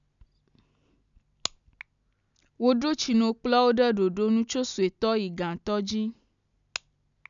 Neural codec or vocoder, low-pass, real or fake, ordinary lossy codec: none; 7.2 kHz; real; none